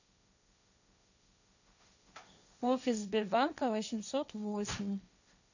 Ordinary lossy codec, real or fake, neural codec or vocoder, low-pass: none; fake; codec, 16 kHz, 1.1 kbps, Voila-Tokenizer; 7.2 kHz